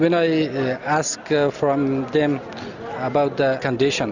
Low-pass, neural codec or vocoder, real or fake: 7.2 kHz; none; real